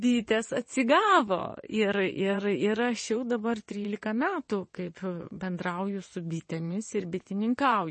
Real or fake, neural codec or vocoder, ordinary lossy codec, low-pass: fake; vocoder, 22.05 kHz, 80 mel bands, WaveNeXt; MP3, 32 kbps; 9.9 kHz